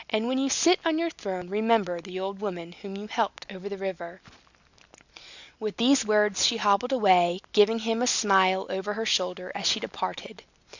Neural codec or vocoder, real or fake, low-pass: none; real; 7.2 kHz